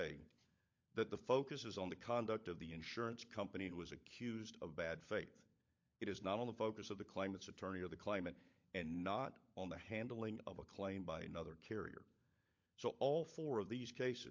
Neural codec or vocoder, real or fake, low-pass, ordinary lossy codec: codec, 16 kHz, 16 kbps, FunCodec, trained on LibriTTS, 50 frames a second; fake; 7.2 kHz; MP3, 48 kbps